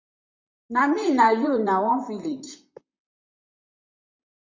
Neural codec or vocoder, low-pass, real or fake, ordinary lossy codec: vocoder, 44.1 kHz, 128 mel bands, Pupu-Vocoder; 7.2 kHz; fake; MP3, 64 kbps